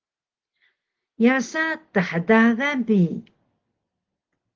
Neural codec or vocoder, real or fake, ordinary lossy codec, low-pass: none; real; Opus, 16 kbps; 7.2 kHz